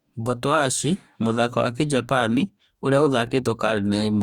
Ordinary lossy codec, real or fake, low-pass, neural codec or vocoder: Opus, 64 kbps; fake; 19.8 kHz; codec, 44.1 kHz, 2.6 kbps, DAC